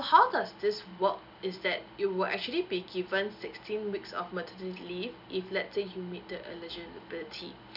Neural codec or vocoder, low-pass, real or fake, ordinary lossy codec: none; 5.4 kHz; real; none